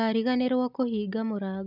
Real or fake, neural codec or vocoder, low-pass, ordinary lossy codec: real; none; 5.4 kHz; none